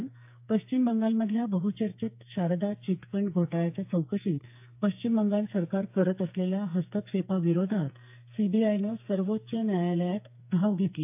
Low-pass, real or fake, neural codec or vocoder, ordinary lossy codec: 3.6 kHz; fake; codec, 44.1 kHz, 2.6 kbps, SNAC; AAC, 32 kbps